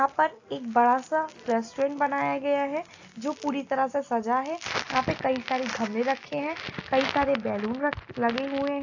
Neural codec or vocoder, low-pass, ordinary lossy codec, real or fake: none; 7.2 kHz; AAC, 48 kbps; real